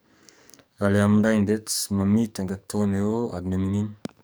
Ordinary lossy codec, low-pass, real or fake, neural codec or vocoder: none; none; fake; codec, 44.1 kHz, 2.6 kbps, SNAC